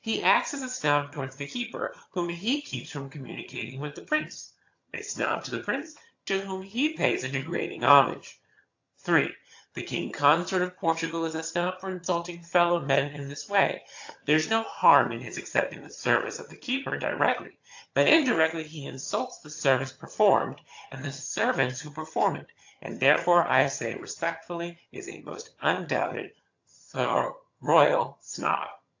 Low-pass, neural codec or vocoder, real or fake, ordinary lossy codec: 7.2 kHz; vocoder, 22.05 kHz, 80 mel bands, HiFi-GAN; fake; AAC, 48 kbps